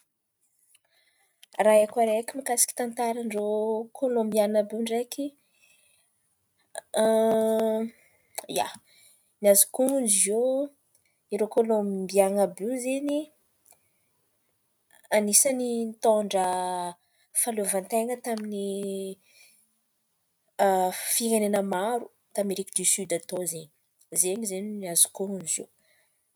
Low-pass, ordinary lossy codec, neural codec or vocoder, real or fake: none; none; none; real